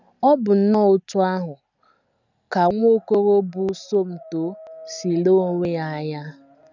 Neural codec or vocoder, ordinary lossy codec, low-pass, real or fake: none; none; 7.2 kHz; real